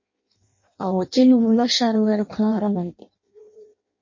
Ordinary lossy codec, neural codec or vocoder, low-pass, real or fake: MP3, 32 kbps; codec, 16 kHz in and 24 kHz out, 0.6 kbps, FireRedTTS-2 codec; 7.2 kHz; fake